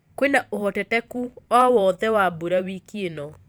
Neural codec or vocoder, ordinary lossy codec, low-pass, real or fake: vocoder, 44.1 kHz, 128 mel bands every 512 samples, BigVGAN v2; none; none; fake